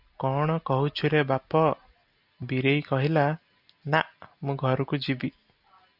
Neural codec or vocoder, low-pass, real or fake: none; 5.4 kHz; real